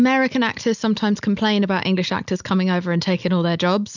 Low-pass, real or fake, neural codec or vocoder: 7.2 kHz; real; none